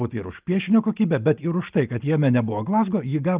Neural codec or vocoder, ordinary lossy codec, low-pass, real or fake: none; Opus, 32 kbps; 3.6 kHz; real